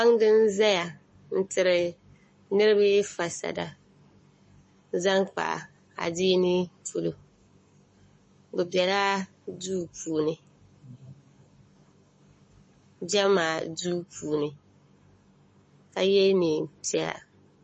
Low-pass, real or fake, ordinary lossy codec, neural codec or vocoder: 10.8 kHz; fake; MP3, 32 kbps; codec, 44.1 kHz, 7.8 kbps, Pupu-Codec